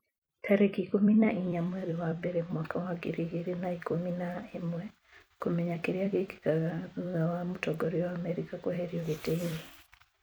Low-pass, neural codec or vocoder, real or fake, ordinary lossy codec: none; vocoder, 44.1 kHz, 128 mel bands every 256 samples, BigVGAN v2; fake; none